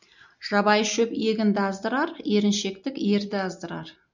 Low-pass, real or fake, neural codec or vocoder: 7.2 kHz; real; none